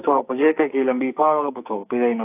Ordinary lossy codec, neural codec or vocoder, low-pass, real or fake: AAC, 32 kbps; codec, 44.1 kHz, 2.6 kbps, SNAC; 3.6 kHz; fake